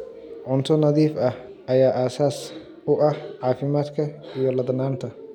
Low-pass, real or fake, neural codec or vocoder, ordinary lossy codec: 19.8 kHz; real; none; none